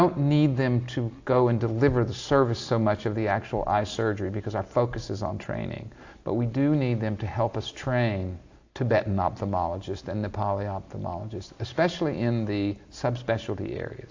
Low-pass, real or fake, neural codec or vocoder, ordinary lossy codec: 7.2 kHz; real; none; AAC, 48 kbps